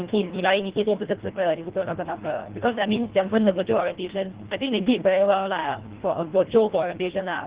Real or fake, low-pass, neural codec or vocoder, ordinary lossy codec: fake; 3.6 kHz; codec, 24 kHz, 1.5 kbps, HILCodec; Opus, 16 kbps